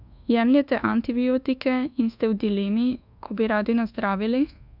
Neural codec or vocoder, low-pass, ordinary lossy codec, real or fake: codec, 24 kHz, 1.2 kbps, DualCodec; 5.4 kHz; none; fake